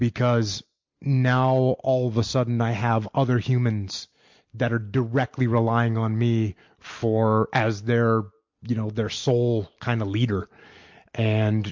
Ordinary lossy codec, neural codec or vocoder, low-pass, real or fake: MP3, 48 kbps; none; 7.2 kHz; real